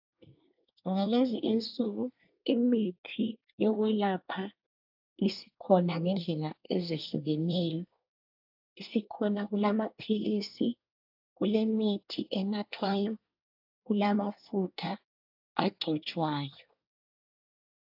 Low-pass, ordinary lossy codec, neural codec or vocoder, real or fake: 5.4 kHz; AAC, 48 kbps; codec, 24 kHz, 1 kbps, SNAC; fake